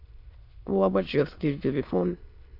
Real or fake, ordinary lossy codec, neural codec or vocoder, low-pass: fake; AAC, 32 kbps; autoencoder, 22.05 kHz, a latent of 192 numbers a frame, VITS, trained on many speakers; 5.4 kHz